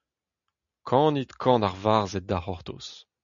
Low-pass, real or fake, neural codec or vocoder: 7.2 kHz; real; none